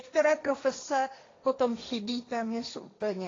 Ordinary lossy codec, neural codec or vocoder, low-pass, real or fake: AAC, 32 kbps; codec, 16 kHz, 1.1 kbps, Voila-Tokenizer; 7.2 kHz; fake